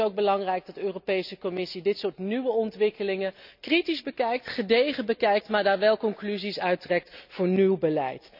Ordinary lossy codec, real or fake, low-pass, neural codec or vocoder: none; real; 5.4 kHz; none